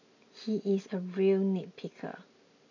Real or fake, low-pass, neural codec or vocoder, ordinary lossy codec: real; 7.2 kHz; none; none